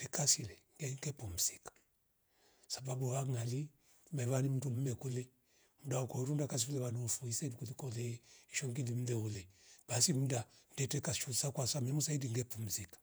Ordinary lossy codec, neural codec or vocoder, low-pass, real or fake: none; vocoder, 48 kHz, 128 mel bands, Vocos; none; fake